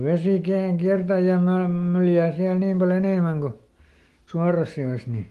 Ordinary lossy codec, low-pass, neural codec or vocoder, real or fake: Opus, 24 kbps; 14.4 kHz; autoencoder, 48 kHz, 128 numbers a frame, DAC-VAE, trained on Japanese speech; fake